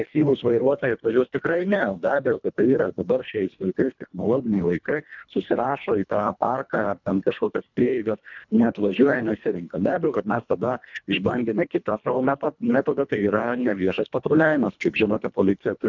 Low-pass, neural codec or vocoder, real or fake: 7.2 kHz; codec, 24 kHz, 1.5 kbps, HILCodec; fake